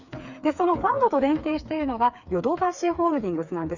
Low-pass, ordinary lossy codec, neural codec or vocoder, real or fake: 7.2 kHz; none; codec, 16 kHz, 4 kbps, FreqCodec, smaller model; fake